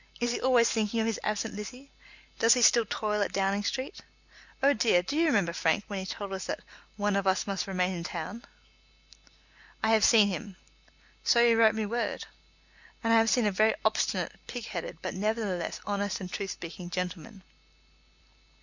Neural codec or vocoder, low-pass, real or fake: none; 7.2 kHz; real